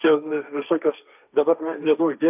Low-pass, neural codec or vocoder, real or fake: 3.6 kHz; codec, 16 kHz, 1.1 kbps, Voila-Tokenizer; fake